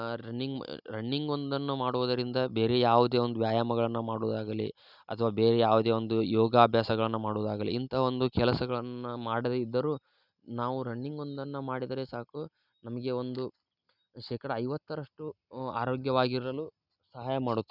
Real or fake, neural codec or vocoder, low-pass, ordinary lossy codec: real; none; 5.4 kHz; none